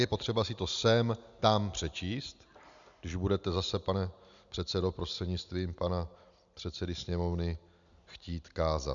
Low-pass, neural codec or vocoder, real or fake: 7.2 kHz; none; real